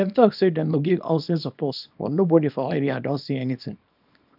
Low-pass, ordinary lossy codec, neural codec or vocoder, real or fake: 5.4 kHz; none; codec, 24 kHz, 0.9 kbps, WavTokenizer, small release; fake